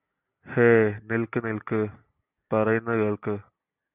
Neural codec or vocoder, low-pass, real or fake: none; 3.6 kHz; real